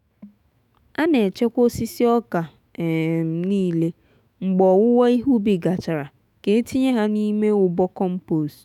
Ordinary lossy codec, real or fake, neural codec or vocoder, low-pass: none; fake; autoencoder, 48 kHz, 128 numbers a frame, DAC-VAE, trained on Japanese speech; 19.8 kHz